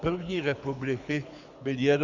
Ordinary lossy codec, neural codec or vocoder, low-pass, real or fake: Opus, 64 kbps; codec, 24 kHz, 6 kbps, HILCodec; 7.2 kHz; fake